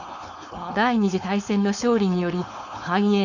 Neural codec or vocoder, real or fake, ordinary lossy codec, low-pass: codec, 16 kHz, 4.8 kbps, FACodec; fake; none; 7.2 kHz